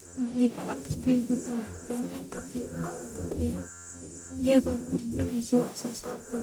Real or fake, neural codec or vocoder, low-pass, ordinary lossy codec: fake; codec, 44.1 kHz, 0.9 kbps, DAC; none; none